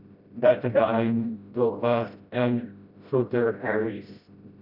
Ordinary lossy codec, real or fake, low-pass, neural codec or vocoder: none; fake; 5.4 kHz; codec, 16 kHz, 0.5 kbps, FreqCodec, smaller model